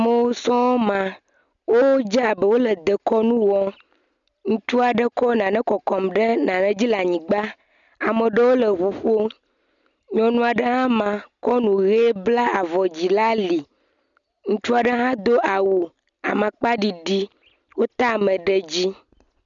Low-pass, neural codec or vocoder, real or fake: 7.2 kHz; none; real